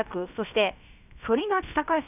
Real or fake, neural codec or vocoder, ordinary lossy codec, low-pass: fake; codec, 16 kHz, about 1 kbps, DyCAST, with the encoder's durations; none; 3.6 kHz